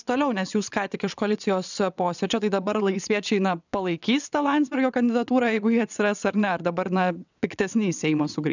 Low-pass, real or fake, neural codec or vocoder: 7.2 kHz; fake; vocoder, 22.05 kHz, 80 mel bands, WaveNeXt